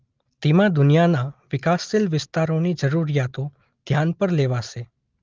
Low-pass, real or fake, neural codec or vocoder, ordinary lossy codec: 7.2 kHz; real; none; Opus, 32 kbps